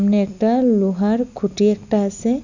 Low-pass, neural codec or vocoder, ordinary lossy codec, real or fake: 7.2 kHz; none; none; real